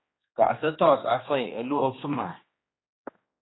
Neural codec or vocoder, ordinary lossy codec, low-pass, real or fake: codec, 16 kHz, 2 kbps, X-Codec, HuBERT features, trained on general audio; AAC, 16 kbps; 7.2 kHz; fake